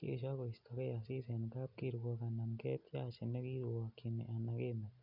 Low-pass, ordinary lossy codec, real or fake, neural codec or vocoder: 5.4 kHz; none; real; none